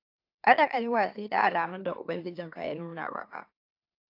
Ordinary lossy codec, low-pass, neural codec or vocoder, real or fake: AAC, 32 kbps; 5.4 kHz; autoencoder, 44.1 kHz, a latent of 192 numbers a frame, MeloTTS; fake